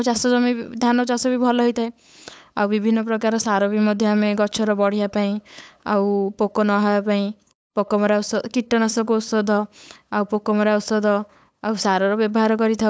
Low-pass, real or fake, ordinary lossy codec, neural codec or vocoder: none; fake; none; codec, 16 kHz, 8 kbps, FunCodec, trained on LibriTTS, 25 frames a second